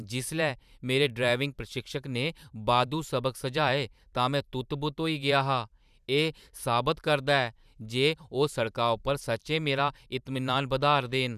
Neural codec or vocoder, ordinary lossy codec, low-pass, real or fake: vocoder, 44.1 kHz, 128 mel bands every 512 samples, BigVGAN v2; none; 14.4 kHz; fake